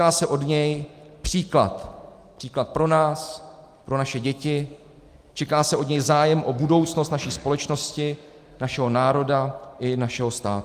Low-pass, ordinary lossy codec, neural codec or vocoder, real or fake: 14.4 kHz; Opus, 32 kbps; none; real